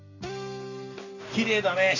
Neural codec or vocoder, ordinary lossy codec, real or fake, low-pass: none; none; real; 7.2 kHz